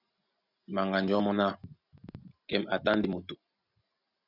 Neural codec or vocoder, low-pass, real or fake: none; 5.4 kHz; real